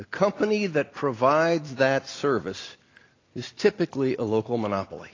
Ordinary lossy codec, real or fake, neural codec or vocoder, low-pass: AAC, 32 kbps; real; none; 7.2 kHz